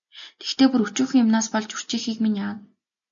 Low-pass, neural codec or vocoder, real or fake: 7.2 kHz; none; real